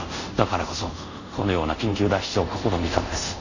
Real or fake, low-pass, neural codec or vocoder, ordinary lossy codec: fake; 7.2 kHz; codec, 24 kHz, 0.5 kbps, DualCodec; none